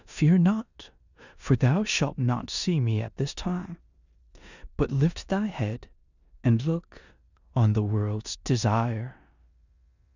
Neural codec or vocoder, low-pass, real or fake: codec, 16 kHz in and 24 kHz out, 0.9 kbps, LongCat-Audio-Codec, four codebook decoder; 7.2 kHz; fake